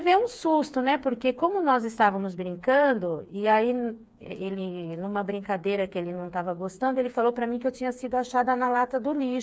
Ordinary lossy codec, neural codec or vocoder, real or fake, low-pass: none; codec, 16 kHz, 4 kbps, FreqCodec, smaller model; fake; none